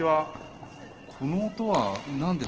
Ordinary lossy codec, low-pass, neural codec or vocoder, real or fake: Opus, 16 kbps; 7.2 kHz; none; real